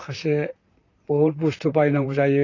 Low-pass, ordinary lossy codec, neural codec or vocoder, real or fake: 7.2 kHz; AAC, 32 kbps; vocoder, 44.1 kHz, 128 mel bands, Pupu-Vocoder; fake